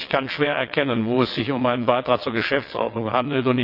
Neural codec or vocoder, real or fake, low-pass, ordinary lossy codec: vocoder, 22.05 kHz, 80 mel bands, WaveNeXt; fake; 5.4 kHz; none